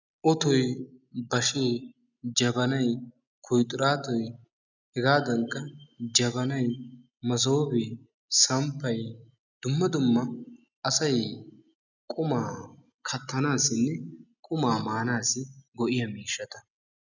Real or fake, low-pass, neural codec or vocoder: real; 7.2 kHz; none